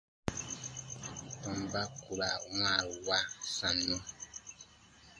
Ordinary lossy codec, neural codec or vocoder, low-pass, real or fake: AAC, 48 kbps; none; 9.9 kHz; real